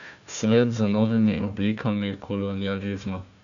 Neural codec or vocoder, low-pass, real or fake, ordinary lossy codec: codec, 16 kHz, 1 kbps, FunCodec, trained on Chinese and English, 50 frames a second; 7.2 kHz; fake; none